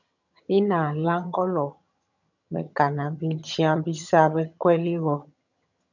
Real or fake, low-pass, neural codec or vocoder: fake; 7.2 kHz; vocoder, 22.05 kHz, 80 mel bands, HiFi-GAN